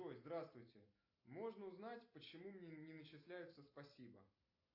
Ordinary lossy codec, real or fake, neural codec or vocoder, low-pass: AAC, 48 kbps; real; none; 5.4 kHz